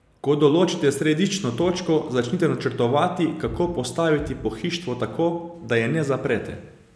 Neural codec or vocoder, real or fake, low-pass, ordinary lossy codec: none; real; none; none